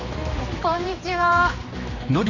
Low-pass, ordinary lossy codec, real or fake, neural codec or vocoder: 7.2 kHz; none; fake; codec, 16 kHz, 2 kbps, X-Codec, HuBERT features, trained on general audio